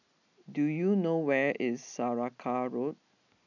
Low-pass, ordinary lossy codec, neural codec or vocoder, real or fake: 7.2 kHz; none; none; real